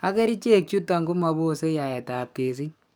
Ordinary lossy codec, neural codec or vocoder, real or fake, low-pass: none; codec, 44.1 kHz, 7.8 kbps, DAC; fake; none